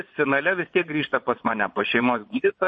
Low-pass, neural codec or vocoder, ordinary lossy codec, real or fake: 9.9 kHz; vocoder, 22.05 kHz, 80 mel bands, Vocos; MP3, 32 kbps; fake